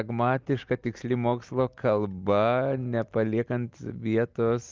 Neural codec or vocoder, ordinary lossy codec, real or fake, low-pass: none; Opus, 32 kbps; real; 7.2 kHz